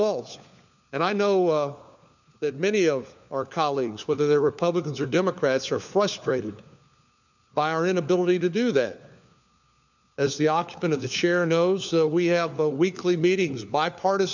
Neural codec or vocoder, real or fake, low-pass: codec, 16 kHz, 4 kbps, FunCodec, trained on LibriTTS, 50 frames a second; fake; 7.2 kHz